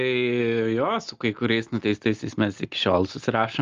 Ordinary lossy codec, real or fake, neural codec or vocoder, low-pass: Opus, 32 kbps; real; none; 7.2 kHz